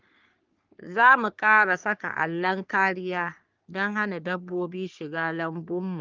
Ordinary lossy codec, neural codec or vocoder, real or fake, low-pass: Opus, 24 kbps; codec, 44.1 kHz, 3.4 kbps, Pupu-Codec; fake; 7.2 kHz